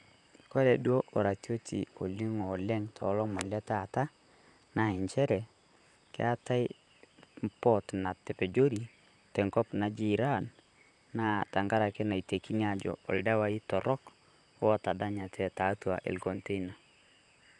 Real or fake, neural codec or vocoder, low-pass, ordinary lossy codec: fake; vocoder, 44.1 kHz, 128 mel bands, Pupu-Vocoder; 10.8 kHz; none